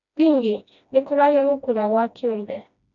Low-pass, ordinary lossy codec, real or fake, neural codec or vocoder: 7.2 kHz; none; fake; codec, 16 kHz, 1 kbps, FreqCodec, smaller model